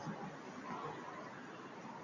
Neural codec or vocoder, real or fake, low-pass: none; real; 7.2 kHz